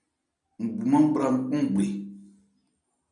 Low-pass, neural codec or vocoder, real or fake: 9.9 kHz; none; real